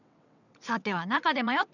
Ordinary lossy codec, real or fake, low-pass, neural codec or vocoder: none; fake; 7.2 kHz; vocoder, 22.05 kHz, 80 mel bands, WaveNeXt